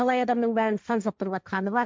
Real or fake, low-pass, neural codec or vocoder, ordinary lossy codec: fake; none; codec, 16 kHz, 1.1 kbps, Voila-Tokenizer; none